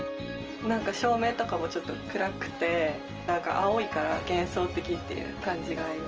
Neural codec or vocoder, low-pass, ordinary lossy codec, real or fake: none; 7.2 kHz; Opus, 24 kbps; real